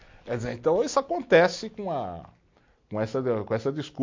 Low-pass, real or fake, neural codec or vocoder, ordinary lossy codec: 7.2 kHz; real; none; MP3, 48 kbps